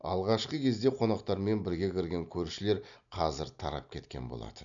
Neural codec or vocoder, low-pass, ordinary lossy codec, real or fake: none; 7.2 kHz; none; real